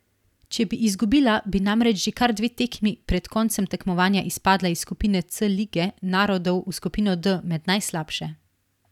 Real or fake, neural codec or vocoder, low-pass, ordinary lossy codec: real; none; 19.8 kHz; none